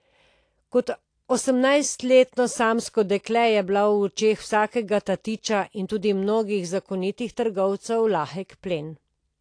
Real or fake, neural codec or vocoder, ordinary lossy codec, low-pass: real; none; AAC, 48 kbps; 9.9 kHz